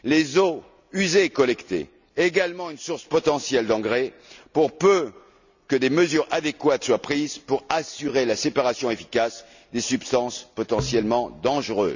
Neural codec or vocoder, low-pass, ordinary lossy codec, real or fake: none; 7.2 kHz; none; real